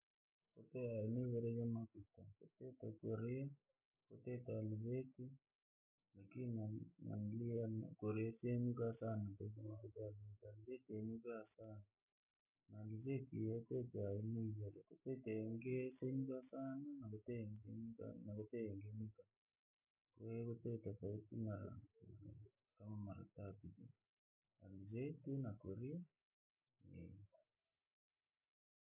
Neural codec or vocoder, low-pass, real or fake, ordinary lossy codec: none; 3.6 kHz; real; none